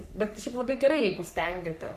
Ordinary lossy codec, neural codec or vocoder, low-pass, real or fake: AAC, 96 kbps; codec, 44.1 kHz, 3.4 kbps, Pupu-Codec; 14.4 kHz; fake